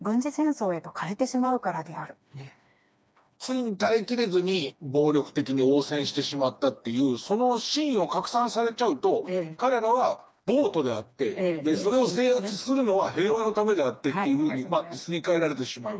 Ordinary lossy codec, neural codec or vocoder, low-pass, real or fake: none; codec, 16 kHz, 2 kbps, FreqCodec, smaller model; none; fake